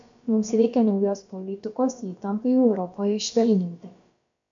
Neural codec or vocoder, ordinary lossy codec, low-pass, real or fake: codec, 16 kHz, about 1 kbps, DyCAST, with the encoder's durations; AAC, 64 kbps; 7.2 kHz; fake